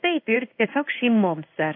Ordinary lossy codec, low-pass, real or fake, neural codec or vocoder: AAC, 32 kbps; 5.4 kHz; fake; codec, 16 kHz in and 24 kHz out, 1 kbps, XY-Tokenizer